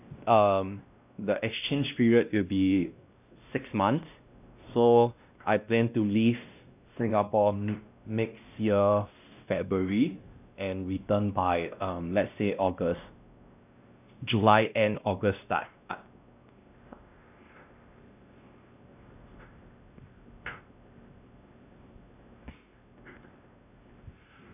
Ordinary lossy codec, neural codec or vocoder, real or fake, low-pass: none; codec, 16 kHz, 1 kbps, X-Codec, WavLM features, trained on Multilingual LibriSpeech; fake; 3.6 kHz